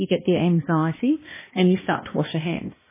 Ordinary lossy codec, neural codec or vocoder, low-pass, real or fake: MP3, 16 kbps; codec, 16 kHz, 1 kbps, X-Codec, HuBERT features, trained on balanced general audio; 3.6 kHz; fake